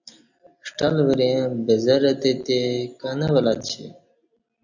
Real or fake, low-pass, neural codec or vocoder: real; 7.2 kHz; none